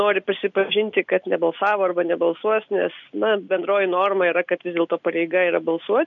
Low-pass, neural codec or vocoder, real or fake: 7.2 kHz; none; real